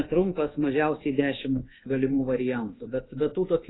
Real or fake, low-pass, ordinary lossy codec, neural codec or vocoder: fake; 7.2 kHz; AAC, 16 kbps; codec, 24 kHz, 1.2 kbps, DualCodec